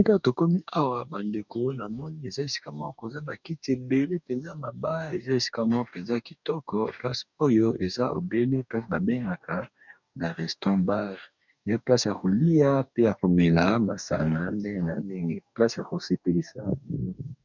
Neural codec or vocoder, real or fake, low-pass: codec, 44.1 kHz, 2.6 kbps, DAC; fake; 7.2 kHz